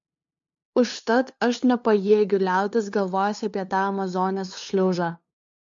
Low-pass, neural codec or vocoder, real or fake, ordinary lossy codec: 7.2 kHz; codec, 16 kHz, 2 kbps, FunCodec, trained on LibriTTS, 25 frames a second; fake; MP3, 48 kbps